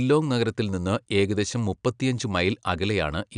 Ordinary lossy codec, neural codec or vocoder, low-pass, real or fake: none; none; 9.9 kHz; real